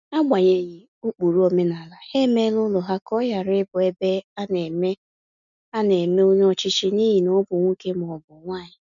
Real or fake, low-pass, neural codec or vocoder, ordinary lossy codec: real; 7.2 kHz; none; none